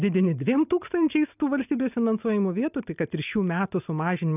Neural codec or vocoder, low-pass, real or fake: none; 3.6 kHz; real